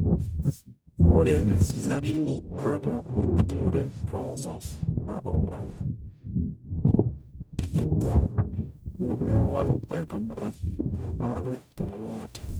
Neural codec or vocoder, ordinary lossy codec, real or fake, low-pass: codec, 44.1 kHz, 0.9 kbps, DAC; none; fake; none